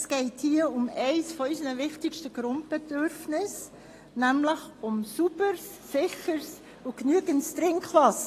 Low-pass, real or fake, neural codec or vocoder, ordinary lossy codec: 14.4 kHz; real; none; AAC, 48 kbps